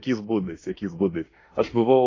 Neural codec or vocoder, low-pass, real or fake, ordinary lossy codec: autoencoder, 48 kHz, 32 numbers a frame, DAC-VAE, trained on Japanese speech; 7.2 kHz; fake; AAC, 32 kbps